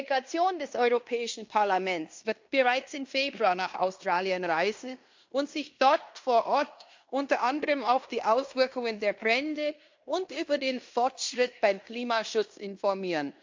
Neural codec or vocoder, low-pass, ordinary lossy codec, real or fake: codec, 16 kHz in and 24 kHz out, 0.9 kbps, LongCat-Audio-Codec, fine tuned four codebook decoder; 7.2 kHz; MP3, 48 kbps; fake